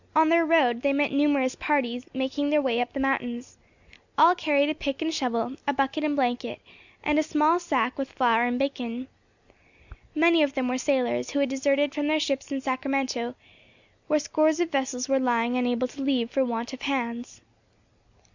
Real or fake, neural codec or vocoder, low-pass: real; none; 7.2 kHz